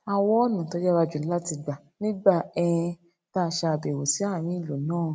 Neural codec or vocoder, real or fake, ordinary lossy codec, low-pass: none; real; none; none